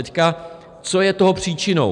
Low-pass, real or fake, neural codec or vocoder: 10.8 kHz; real; none